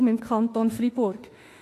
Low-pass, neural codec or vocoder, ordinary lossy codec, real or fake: 14.4 kHz; autoencoder, 48 kHz, 32 numbers a frame, DAC-VAE, trained on Japanese speech; AAC, 48 kbps; fake